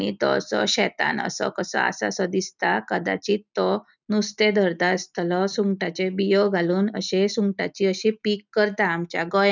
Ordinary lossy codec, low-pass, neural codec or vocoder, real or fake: none; 7.2 kHz; none; real